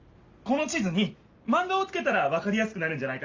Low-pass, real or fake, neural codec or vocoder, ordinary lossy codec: 7.2 kHz; real; none; Opus, 32 kbps